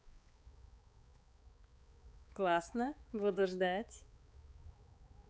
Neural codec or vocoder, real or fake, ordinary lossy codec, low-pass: codec, 16 kHz, 4 kbps, X-Codec, HuBERT features, trained on balanced general audio; fake; none; none